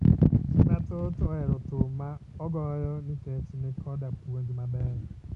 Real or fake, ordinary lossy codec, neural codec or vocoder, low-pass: real; none; none; 10.8 kHz